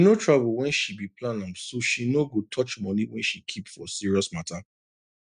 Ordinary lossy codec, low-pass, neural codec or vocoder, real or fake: none; 9.9 kHz; none; real